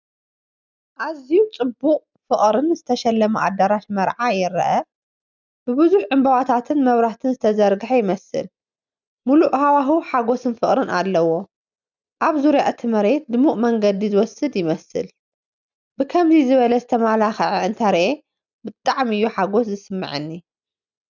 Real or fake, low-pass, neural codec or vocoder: real; 7.2 kHz; none